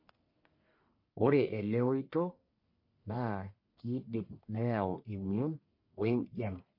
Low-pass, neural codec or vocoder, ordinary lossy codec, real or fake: 5.4 kHz; codec, 44.1 kHz, 1.7 kbps, Pupu-Codec; MP3, 32 kbps; fake